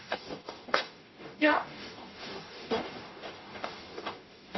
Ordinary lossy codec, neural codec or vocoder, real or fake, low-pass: MP3, 24 kbps; codec, 44.1 kHz, 0.9 kbps, DAC; fake; 7.2 kHz